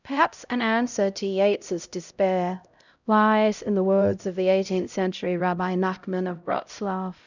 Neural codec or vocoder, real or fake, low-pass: codec, 16 kHz, 0.5 kbps, X-Codec, HuBERT features, trained on LibriSpeech; fake; 7.2 kHz